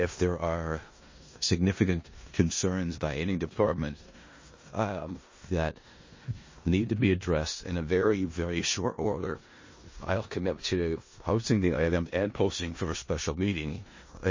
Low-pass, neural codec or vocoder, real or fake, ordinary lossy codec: 7.2 kHz; codec, 16 kHz in and 24 kHz out, 0.4 kbps, LongCat-Audio-Codec, four codebook decoder; fake; MP3, 32 kbps